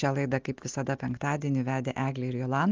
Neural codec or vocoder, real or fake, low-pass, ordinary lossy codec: none; real; 7.2 kHz; Opus, 32 kbps